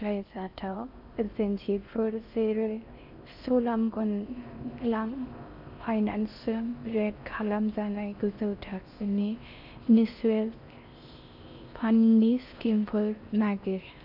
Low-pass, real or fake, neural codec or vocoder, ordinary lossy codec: 5.4 kHz; fake; codec, 16 kHz in and 24 kHz out, 0.6 kbps, FocalCodec, streaming, 4096 codes; none